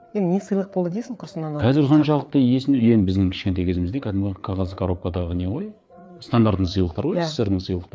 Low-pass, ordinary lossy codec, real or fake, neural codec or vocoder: none; none; fake; codec, 16 kHz, 4 kbps, FreqCodec, larger model